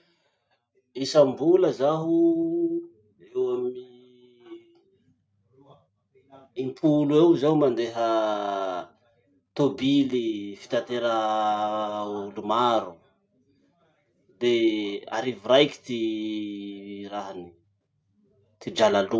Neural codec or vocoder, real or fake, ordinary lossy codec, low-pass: none; real; none; none